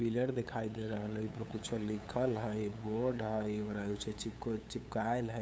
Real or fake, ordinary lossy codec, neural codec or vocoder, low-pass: fake; none; codec, 16 kHz, 8 kbps, FunCodec, trained on LibriTTS, 25 frames a second; none